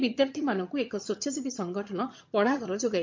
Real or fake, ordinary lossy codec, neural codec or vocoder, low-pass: fake; MP3, 48 kbps; vocoder, 22.05 kHz, 80 mel bands, HiFi-GAN; 7.2 kHz